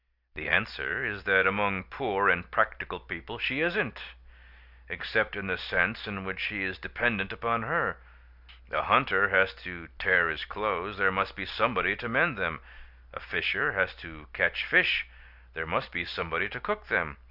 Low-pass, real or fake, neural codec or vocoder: 5.4 kHz; real; none